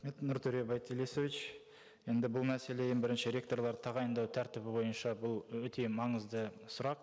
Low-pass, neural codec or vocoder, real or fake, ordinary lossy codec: none; none; real; none